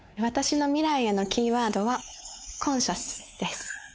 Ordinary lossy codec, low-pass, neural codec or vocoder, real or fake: none; none; codec, 16 kHz, 4 kbps, X-Codec, WavLM features, trained on Multilingual LibriSpeech; fake